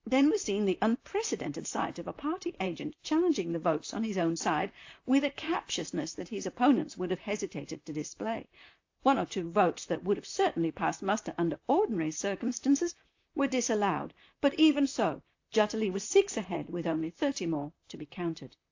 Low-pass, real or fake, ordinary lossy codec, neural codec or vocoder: 7.2 kHz; fake; AAC, 48 kbps; vocoder, 44.1 kHz, 128 mel bands, Pupu-Vocoder